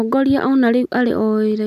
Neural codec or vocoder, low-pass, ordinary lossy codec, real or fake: none; 14.4 kHz; none; real